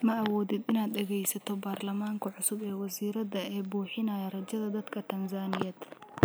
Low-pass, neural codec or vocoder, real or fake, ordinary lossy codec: none; none; real; none